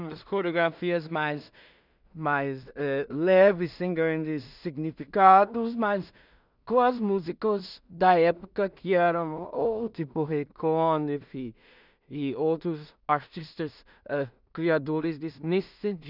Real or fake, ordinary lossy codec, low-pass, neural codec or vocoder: fake; none; 5.4 kHz; codec, 16 kHz in and 24 kHz out, 0.4 kbps, LongCat-Audio-Codec, two codebook decoder